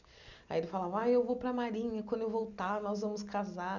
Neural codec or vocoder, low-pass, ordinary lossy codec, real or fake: none; 7.2 kHz; none; real